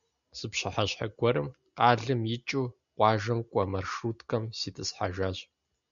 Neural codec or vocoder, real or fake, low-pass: none; real; 7.2 kHz